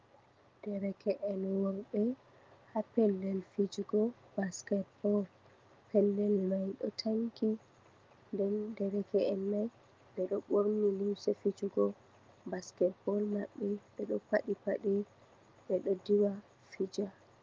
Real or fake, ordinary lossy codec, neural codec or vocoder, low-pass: real; Opus, 24 kbps; none; 7.2 kHz